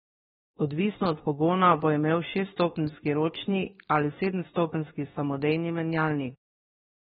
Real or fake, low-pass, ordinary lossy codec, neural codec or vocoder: fake; 19.8 kHz; AAC, 16 kbps; autoencoder, 48 kHz, 32 numbers a frame, DAC-VAE, trained on Japanese speech